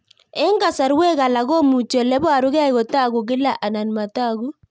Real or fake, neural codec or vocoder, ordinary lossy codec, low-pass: real; none; none; none